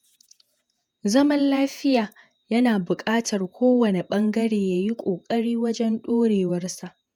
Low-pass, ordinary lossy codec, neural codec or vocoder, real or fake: 19.8 kHz; none; vocoder, 48 kHz, 128 mel bands, Vocos; fake